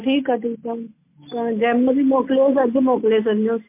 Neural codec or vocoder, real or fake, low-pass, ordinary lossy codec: none; real; 3.6 kHz; MP3, 24 kbps